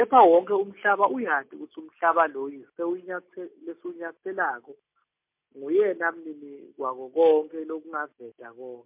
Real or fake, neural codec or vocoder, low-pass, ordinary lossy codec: real; none; 3.6 kHz; MP3, 32 kbps